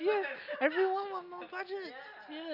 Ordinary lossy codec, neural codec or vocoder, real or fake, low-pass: none; none; real; 5.4 kHz